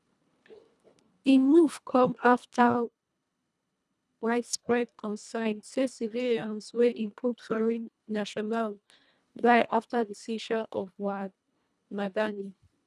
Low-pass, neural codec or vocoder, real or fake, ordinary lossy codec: none; codec, 24 kHz, 1.5 kbps, HILCodec; fake; none